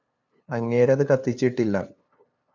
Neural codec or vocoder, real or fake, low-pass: codec, 16 kHz, 2 kbps, FunCodec, trained on LibriTTS, 25 frames a second; fake; 7.2 kHz